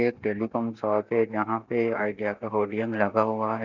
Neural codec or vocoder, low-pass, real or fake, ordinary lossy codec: none; 7.2 kHz; real; none